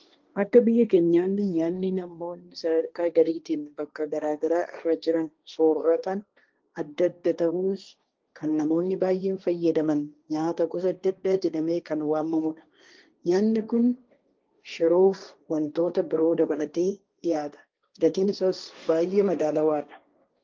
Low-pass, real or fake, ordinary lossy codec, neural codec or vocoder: 7.2 kHz; fake; Opus, 32 kbps; codec, 16 kHz, 1.1 kbps, Voila-Tokenizer